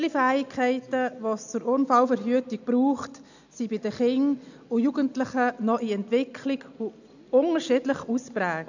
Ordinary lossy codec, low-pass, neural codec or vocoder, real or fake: none; 7.2 kHz; none; real